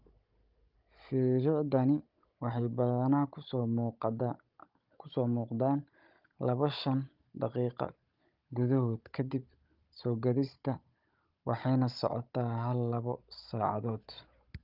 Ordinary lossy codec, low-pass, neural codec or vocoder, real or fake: Opus, 24 kbps; 5.4 kHz; codec, 16 kHz, 16 kbps, FunCodec, trained on Chinese and English, 50 frames a second; fake